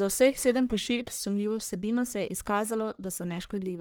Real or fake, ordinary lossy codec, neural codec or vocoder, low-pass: fake; none; codec, 44.1 kHz, 1.7 kbps, Pupu-Codec; none